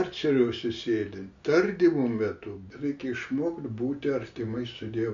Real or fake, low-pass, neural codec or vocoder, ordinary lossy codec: real; 7.2 kHz; none; MP3, 48 kbps